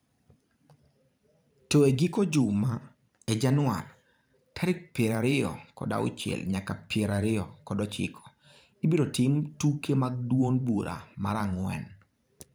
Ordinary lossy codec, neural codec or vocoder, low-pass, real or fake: none; vocoder, 44.1 kHz, 128 mel bands every 512 samples, BigVGAN v2; none; fake